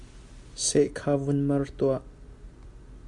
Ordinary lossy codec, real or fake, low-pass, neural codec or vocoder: MP3, 64 kbps; real; 10.8 kHz; none